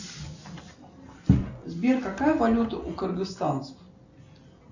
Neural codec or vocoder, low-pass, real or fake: none; 7.2 kHz; real